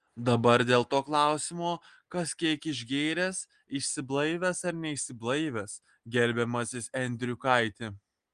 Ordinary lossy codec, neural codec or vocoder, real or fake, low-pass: Opus, 24 kbps; none; real; 9.9 kHz